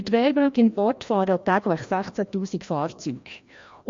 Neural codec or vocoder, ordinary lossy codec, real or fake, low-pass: codec, 16 kHz, 1 kbps, FreqCodec, larger model; MP3, 48 kbps; fake; 7.2 kHz